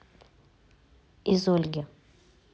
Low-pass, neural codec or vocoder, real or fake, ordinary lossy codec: none; none; real; none